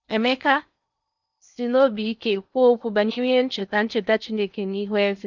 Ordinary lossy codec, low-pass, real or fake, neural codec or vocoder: none; 7.2 kHz; fake; codec, 16 kHz in and 24 kHz out, 0.8 kbps, FocalCodec, streaming, 65536 codes